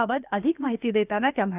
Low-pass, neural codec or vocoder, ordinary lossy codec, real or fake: 3.6 kHz; codec, 16 kHz, about 1 kbps, DyCAST, with the encoder's durations; none; fake